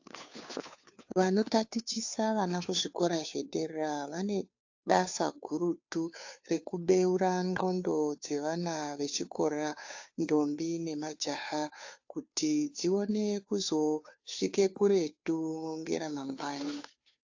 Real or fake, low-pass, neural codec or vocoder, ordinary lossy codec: fake; 7.2 kHz; codec, 16 kHz, 2 kbps, FunCodec, trained on Chinese and English, 25 frames a second; AAC, 48 kbps